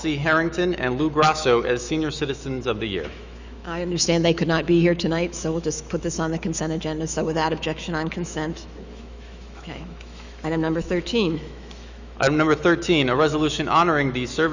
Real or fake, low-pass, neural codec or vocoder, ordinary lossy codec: fake; 7.2 kHz; autoencoder, 48 kHz, 128 numbers a frame, DAC-VAE, trained on Japanese speech; Opus, 64 kbps